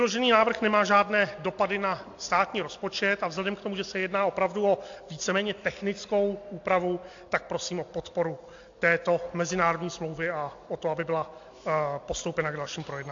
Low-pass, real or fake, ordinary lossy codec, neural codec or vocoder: 7.2 kHz; real; AAC, 48 kbps; none